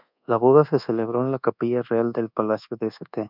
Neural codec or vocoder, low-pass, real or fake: codec, 24 kHz, 1.2 kbps, DualCodec; 5.4 kHz; fake